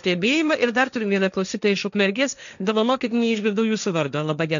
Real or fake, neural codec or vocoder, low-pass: fake; codec, 16 kHz, 1.1 kbps, Voila-Tokenizer; 7.2 kHz